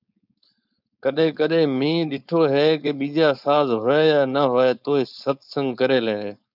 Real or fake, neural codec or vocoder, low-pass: fake; codec, 16 kHz, 4.8 kbps, FACodec; 5.4 kHz